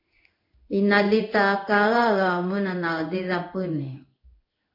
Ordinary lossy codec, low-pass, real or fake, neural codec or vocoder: MP3, 32 kbps; 5.4 kHz; fake; codec, 16 kHz in and 24 kHz out, 1 kbps, XY-Tokenizer